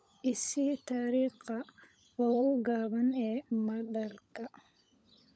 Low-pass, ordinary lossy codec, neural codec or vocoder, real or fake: none; none; codec, 16 kHz, 16 kbps, FunCodec, trained on LibriTTS, 50 frames a second; fake